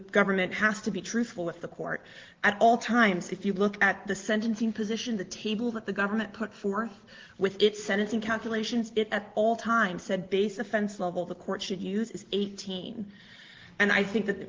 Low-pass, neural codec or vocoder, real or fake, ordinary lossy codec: 7.2 kHz; none; real; Opus, 16 kbps